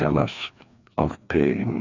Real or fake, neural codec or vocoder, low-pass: fake; codec, 16 kHz, 2 kbps, FreqCodec, larger model; 7.2 kHz